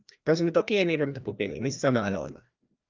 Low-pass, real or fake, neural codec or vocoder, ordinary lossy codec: 7.2 kHz; fake; codec, 16 kHz, 1 kbps, FreqCodec, larger model; Opus, 24 kbps